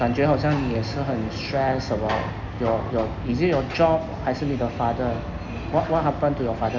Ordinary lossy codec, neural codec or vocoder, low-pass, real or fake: none; none; 7.2 kHz; real